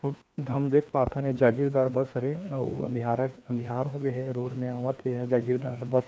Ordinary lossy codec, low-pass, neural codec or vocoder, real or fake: none; none; codec, 16 kHz, 2 kbps, FreqCodec, larger model; fake